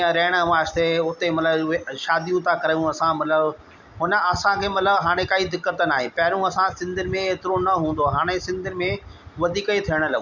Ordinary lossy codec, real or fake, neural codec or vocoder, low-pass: none; real; none; 7.2 kHz